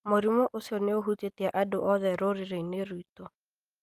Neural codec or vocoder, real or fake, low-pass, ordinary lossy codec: none; real; 14.4 kHz; Opus, 32 kbps